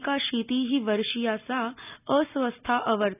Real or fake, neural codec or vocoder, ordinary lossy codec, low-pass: real; none; none; 3.6 kHz